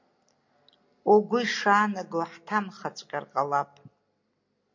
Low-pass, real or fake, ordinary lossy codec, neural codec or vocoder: 7.2 kHz; real; MP3, 48 kbps; none